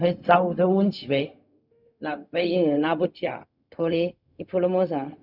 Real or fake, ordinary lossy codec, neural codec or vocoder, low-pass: fake; none; codec, 16 kHz, 0.4 kbps, LongCat-Audio-Codec; 5.4 kHz